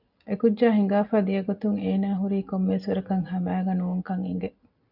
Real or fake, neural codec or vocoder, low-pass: real; none; 5.4 kHz